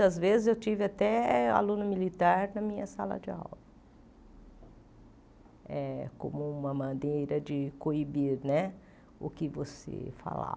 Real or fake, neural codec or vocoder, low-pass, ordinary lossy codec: real; none; none; none